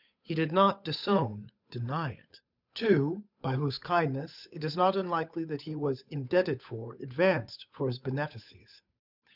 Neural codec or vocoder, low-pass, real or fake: codec, 16 kHz, 8 kbps, FunCodec, trained on Chinese and English, 25 frames a second; 5.4 kHz; fake